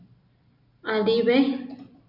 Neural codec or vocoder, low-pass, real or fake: none; 5.4 kHz; real